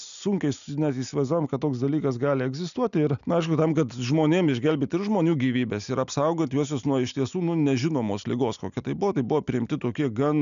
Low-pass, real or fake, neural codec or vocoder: 7.2 kHz; real; none